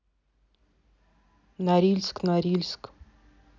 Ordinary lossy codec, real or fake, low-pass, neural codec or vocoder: none; real; 7.2 kHz; none